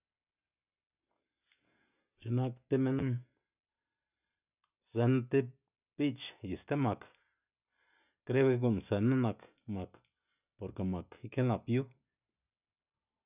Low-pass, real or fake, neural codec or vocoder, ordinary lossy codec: 3.6 kHz; real; none; none